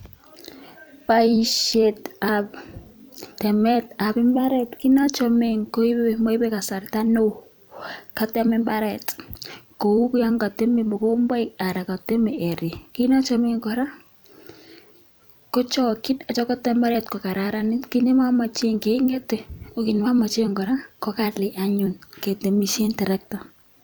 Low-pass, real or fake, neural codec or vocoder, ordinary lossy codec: none; fake; vocoder, 44.1 kHz, 128 mel bands every 256 samples, BigVGAN v2; none